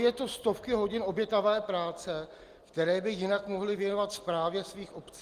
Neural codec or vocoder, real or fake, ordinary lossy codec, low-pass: none; real; Opus, 24 kbps; 14.4 kHz